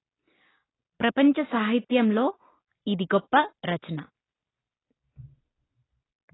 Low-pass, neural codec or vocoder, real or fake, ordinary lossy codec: 7.2 kHz; none; real; AAC, 16 kbps